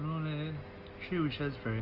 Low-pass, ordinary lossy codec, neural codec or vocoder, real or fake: 5.4 kHz; Opus, 24 kbps; none; real